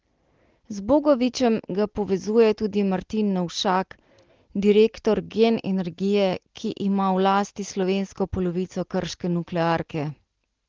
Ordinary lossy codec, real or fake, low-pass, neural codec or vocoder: Opus, 16 kbps; real; 7.2 kHz; none